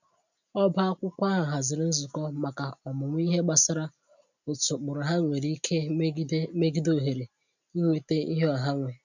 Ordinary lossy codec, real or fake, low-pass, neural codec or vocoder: none; real; 7.2 kHz; none